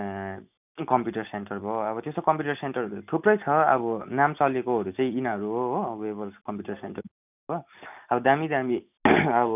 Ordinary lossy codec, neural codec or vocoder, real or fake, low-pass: none; none; real; 3.6 kHz